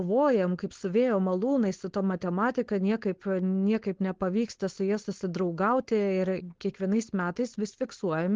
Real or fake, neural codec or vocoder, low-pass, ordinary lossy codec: fake; codec, 16 kHz, 4.8 kbps, FACodec; 7.2 kHz; Opus, 16 kbps